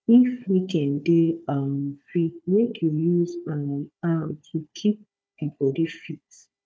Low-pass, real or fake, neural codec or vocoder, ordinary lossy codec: none; fake; codec, 16 kHz, 4 kbps, FunCodec, trained on Chinese and English, 50 frames a second; none